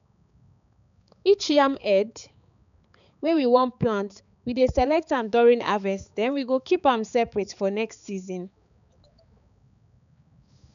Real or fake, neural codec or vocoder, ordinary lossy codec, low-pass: fake; codec, 16 kHz, 4 kbps, X-Codec, HuBERT features, trained on balanced general audio; none; 7.2 kHz